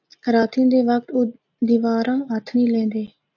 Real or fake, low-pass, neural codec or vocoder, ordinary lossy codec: real; 7.2 kHz; none; AAC, 48 kbps